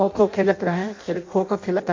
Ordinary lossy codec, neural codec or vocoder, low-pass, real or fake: MP3, 48 kbps; codec, 16 kHz in and 24 kHz out, 0.6 kbps, FireRedTTS-2 codec; 7.2 kHz; fake